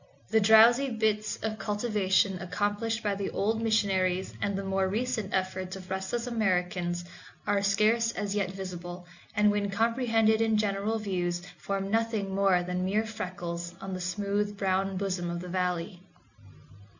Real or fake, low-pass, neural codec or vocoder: real; 7.2 kHz; none